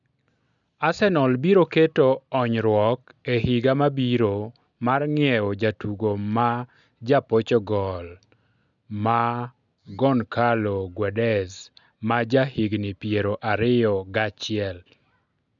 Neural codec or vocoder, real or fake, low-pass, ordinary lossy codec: none; real; 7.2 kHz; none